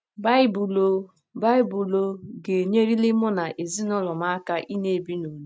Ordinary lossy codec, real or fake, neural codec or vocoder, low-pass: none; real; none; none